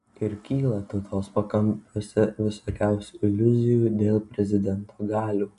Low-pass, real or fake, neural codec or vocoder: 10.8 kHz; real; none